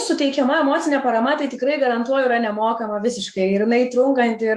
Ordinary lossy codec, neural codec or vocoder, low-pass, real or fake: Opus, 64 kbps; autoencoder, 48 kHz, 128 numbers a frame, DAC-VAE, trained on Japanese speech; 14.4 kHz; fake